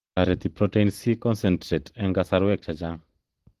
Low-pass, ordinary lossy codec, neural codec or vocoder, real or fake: 14.4 kHz; Opus, 16 kbps; vocoder, 44.1 kHz, 128 mel bands every 512 samples, BigVGAN v2; fake